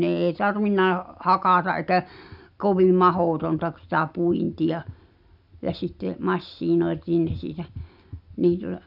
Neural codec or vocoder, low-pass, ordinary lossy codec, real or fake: none; 5.4 kHz; none; real